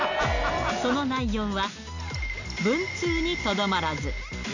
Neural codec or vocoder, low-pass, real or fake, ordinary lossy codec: none; 7.2 kHz; real; none